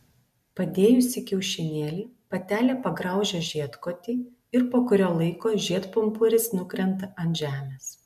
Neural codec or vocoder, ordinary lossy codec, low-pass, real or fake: none; MP3, 96 kbps; 14.4 kHz; real